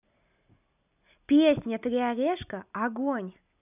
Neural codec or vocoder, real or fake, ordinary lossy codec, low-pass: none; real; none; 3.6 kHz